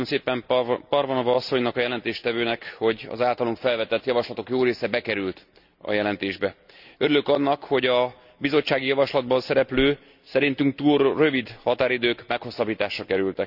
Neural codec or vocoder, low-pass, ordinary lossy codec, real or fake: none; 5.4 kHz; none; real